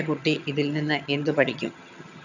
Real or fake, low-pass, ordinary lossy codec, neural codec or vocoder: fake; 7.2 kHz; none; vocoder, 22.05 kHz, 80 mel bands, HiFi-GAN